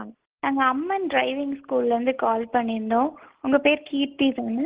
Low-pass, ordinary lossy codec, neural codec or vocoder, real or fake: 3.6 kHz; Opus, 16 kbps; none; real